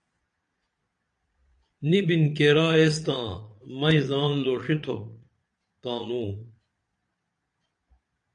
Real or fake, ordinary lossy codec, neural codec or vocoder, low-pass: fake; AAC, 64 kbps; vocoder, 22.05 kHz, 80 mel bands, Vocos; 9.9 kHz